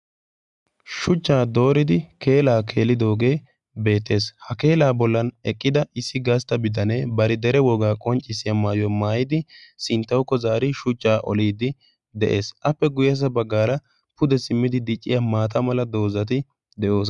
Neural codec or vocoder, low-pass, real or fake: none; 10.8 kHz; real